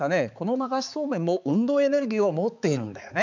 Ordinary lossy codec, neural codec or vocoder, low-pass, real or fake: none; codec, 16 kHz, 4 kbps, X-Codec, HuBERT features, trained on balanced general audio; 7.2 kHz; fake